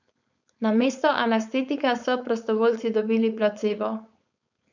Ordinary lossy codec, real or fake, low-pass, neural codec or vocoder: none; fake; 7.2 kHz; codec, 16 kHz, 4.8 kbps, FACodec